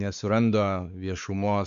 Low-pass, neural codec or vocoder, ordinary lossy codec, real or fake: 7.2 kHz; codec, 16 kHz, 4 kbps, X-Codec, WavLM features, trained on Multilingual LibriSpeech; AAC, 96 kbps; fake